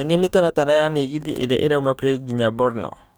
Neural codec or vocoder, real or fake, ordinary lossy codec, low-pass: codec, 44.1 kHz, 2.6 kbps, DAC; fake; none; none